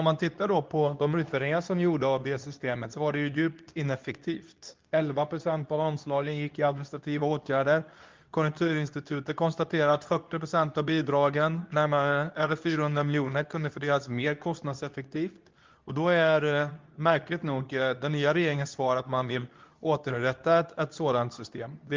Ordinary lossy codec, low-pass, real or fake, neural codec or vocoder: Opus, 24 kbps; 7.2 kHz; fake; codec, 24 kHz, 0.9 kbps, WavTokenizer, medium speech release version 2